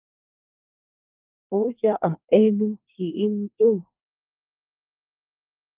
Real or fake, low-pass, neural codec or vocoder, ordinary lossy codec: fake; 3.6 kHz; codec, 24 kHz, 1 kbps, SNAC; Opus, 32 kbps